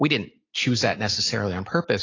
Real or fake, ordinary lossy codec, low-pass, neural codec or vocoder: real; AAC, 32 kbps; 7.2 kHz; none